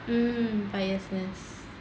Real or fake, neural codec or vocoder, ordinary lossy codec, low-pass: real; none; none; none